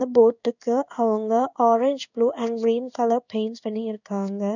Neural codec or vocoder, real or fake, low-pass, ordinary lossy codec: codec, 16 kHz in and 24 kHz out, 1 kbps, XY-Tokenizer; fake; 7.2 kHz; none